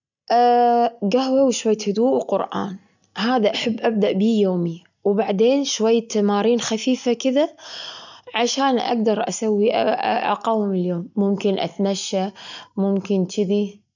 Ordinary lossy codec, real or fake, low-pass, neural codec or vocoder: none; real; 7.2 kHz; none